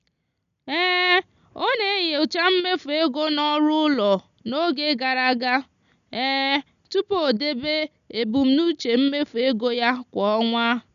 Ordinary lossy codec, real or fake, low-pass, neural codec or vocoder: none; real; 7.2 kHz; none